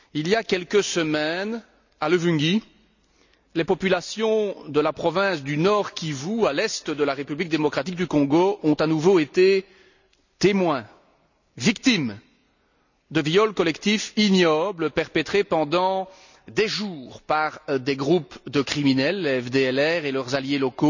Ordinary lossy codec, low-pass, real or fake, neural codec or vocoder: none; 7.2 kHz; real; none